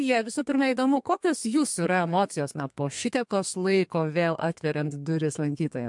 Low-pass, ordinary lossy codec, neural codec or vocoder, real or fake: 10.8 kHz; MP3, 64 kbps; codec, 32 kHz, 1.9 kbps, SNAC; fake